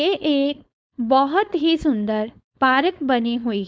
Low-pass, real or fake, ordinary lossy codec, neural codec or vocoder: none; fake; none; codec, 16 kHz, 4.8 kbps, FACodec